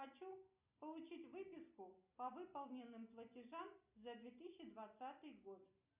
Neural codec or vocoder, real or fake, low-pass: none; real; 3.6 kHz